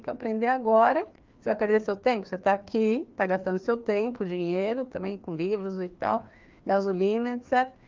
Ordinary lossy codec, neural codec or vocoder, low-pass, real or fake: Opus, 24 kbps; codec, 16 kHz, 2 kbps, FreqCodec, larger model; 7.2 kHz; fake